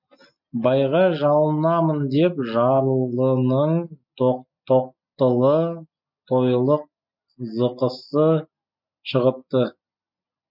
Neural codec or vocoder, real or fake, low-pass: none; real; 5.4 kHz